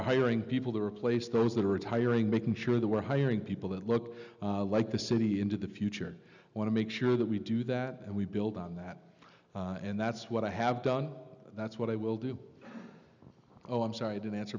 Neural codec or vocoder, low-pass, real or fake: none; 7.2 kHz; real